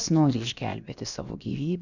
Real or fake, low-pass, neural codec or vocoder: fake; 7.2 kHz; codec, 16 kHz, about 1 kbps, DyCAST, with the encoder's durations